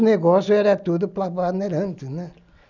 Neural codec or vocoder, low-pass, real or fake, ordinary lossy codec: none; 7.2 kHz; real; none